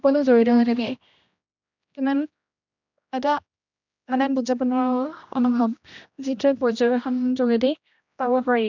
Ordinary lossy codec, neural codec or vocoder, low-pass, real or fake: none; codec, 16 kHz, 1 kbps, X-Codec, HuBERT features, trained on general audio; 7.2 kHz; fake